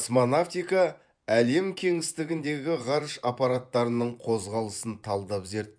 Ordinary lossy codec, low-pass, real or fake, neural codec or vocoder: none; 9.9 kHz; fake; vocoder, 24 kHz, 100 mel bands, Vocos